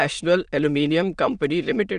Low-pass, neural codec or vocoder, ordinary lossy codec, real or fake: 9.9 kHz; autoencoder, 22.05 kHz, a latent of 192 numbers a frame, VITS, trained on many speakers; none; fake